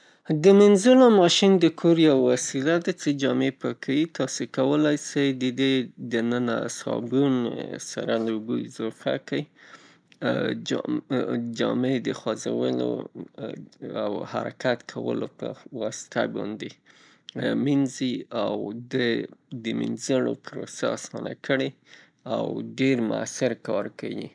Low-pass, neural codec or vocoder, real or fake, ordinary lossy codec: 9.9 kHz; none; real; none